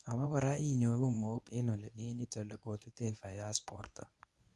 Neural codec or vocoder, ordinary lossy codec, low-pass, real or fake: codec, 24 kHz, 0.9 kbps, WavTokenizer, medium speech release version 1; none; none; fake